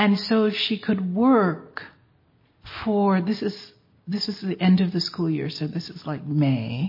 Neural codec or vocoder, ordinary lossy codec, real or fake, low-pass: none; MP3, 24 kbps; real; 5.4 kHz